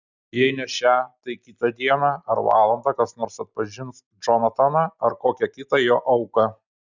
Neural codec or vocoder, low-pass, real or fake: none; 7.2 kHz; real